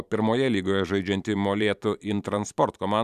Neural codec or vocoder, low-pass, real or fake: vocoder, 44.1 kHz, 128 mel bands every 512 samples, BigVGAN v2; 14.4 kHz; fake